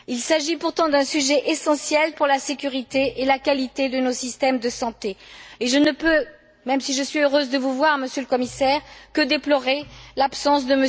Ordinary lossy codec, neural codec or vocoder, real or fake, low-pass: none; none; real; none